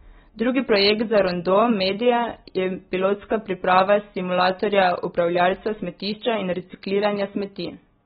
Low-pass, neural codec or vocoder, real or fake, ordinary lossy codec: 19.8 kHz; none; real; AAC, 16 kbps